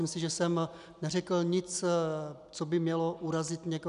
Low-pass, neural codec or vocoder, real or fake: 10.8 kHz; none; real